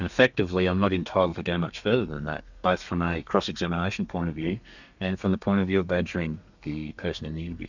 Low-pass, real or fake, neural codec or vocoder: 7.2 kHz; fake; codec, 32 kHz, 1.9 kbps, SNAC